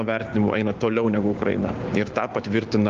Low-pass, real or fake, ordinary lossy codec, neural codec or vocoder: 7.2 kHz; fake; Opus, 24 kbps; codec, 16 kHz, 6 kbps, DAC